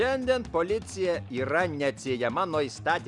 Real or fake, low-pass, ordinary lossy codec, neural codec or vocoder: real; 10.8 kHz; Opus, 64 kbps; none